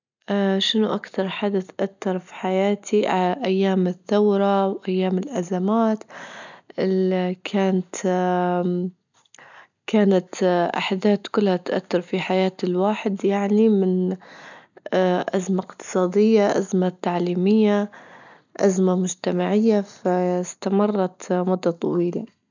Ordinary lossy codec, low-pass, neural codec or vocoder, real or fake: none; 7.2 kHz; none; real